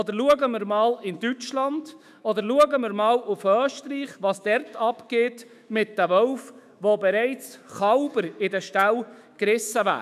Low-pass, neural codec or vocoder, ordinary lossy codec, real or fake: 14.4 kHz; autoencoder, 48 kHz, 128 numbers a frame, DAC-VAE, trained on Japanese speech; none; fake